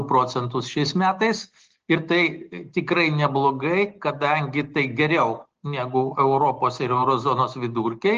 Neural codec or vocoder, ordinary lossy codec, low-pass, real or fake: none; Opus, 16 kbps; 7.2 kHz; real